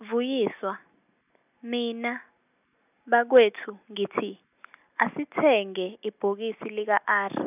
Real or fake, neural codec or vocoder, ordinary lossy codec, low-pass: real; none; none; 3.6 kHz